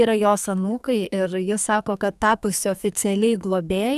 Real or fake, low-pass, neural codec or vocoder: fake; 14.4 kHz; codec, 44.1 kHz, 2.6 kbps, SNAC